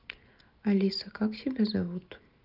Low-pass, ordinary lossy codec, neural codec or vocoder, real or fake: 5.4 kHz; Opus, 32 kbps; none; real